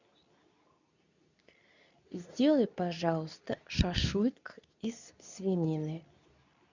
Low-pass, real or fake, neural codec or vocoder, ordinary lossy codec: 7.2 kHz; fake; codec, 24 kHz, 0.9 kbps, WavTokenizer, medium speech release version 2; none